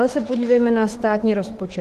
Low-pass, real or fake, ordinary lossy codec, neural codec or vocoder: 14.4 kHz; fake; Opus, 16 kbps; autoencoder, 48 kHz, 32 numbers a frame, DAC-VAE, trained on Japanese speech